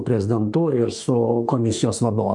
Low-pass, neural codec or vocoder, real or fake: 10.8 kHz; autoencoder, 48 kHz, 32 numbers a frame, DAC-VAE, trained on Japanese speech; fake